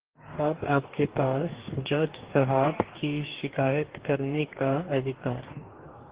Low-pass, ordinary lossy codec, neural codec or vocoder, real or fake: 3.6 kHz; Opus, 16 kbps; codec, 44.1 kHz, 2.6 kbps, DAC; fake